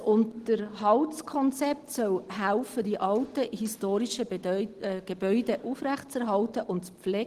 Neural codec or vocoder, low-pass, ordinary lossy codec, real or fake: none; 14.4 kHz; Opus, 16 kbps; real